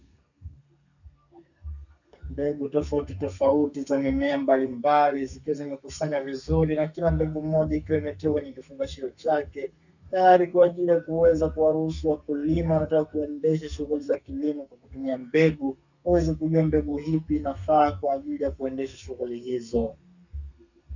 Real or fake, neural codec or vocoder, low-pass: fake; codec, 32 kHz, 1.9 kbps, SNAC; 7.2 kHz